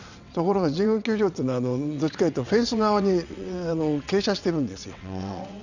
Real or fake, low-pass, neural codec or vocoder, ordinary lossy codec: fake; 7.2 kHz; vocoder, 22.05 kHz, 80 mel bands, WaveNeXt; none